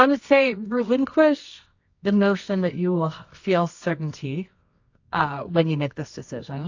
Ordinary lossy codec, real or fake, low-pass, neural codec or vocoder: AAC, 48 kbps; fake; 7.2 kHz; codec, 24 kHz, 0.9 kbps, WavTokenizer, medium music audio release